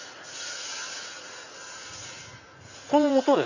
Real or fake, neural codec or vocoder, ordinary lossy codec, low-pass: fake; vocoder, 44.1 kHz, 80 mel bands, Vocos; none; 7.2 kHz